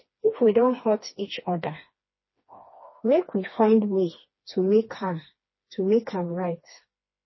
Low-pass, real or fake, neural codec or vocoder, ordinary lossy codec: 7.2 kHz; fake; codec, 16 kHz, 2 kbps, FreqCodec, smaller model; MP3, 24 kbps